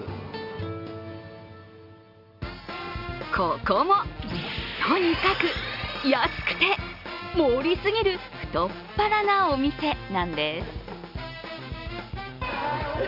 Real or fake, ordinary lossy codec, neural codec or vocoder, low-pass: real; none; none; 5.4 kHz